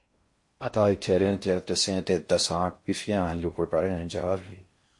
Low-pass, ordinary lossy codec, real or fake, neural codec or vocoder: 10.8 kHz; MP3, 48 kbps; fake; codec, 16 kHz in and 24 kHz out, 0.6 kbps, FocalCodec, streaming, 4096 codes